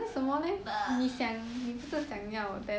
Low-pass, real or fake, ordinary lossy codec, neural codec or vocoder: none; real; none; none